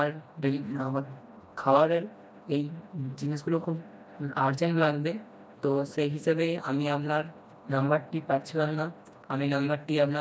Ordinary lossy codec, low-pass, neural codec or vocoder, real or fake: none; none; codec, 16 kHz, 1 kbps, FreqCodec, smaller model; fake